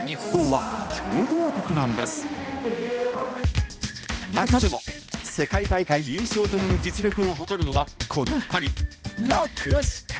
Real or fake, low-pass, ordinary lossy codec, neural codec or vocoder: fake; none; none; codec, 16 kHz, 1 kbps, X-Codec, HuBERT features, trained on balanced general audio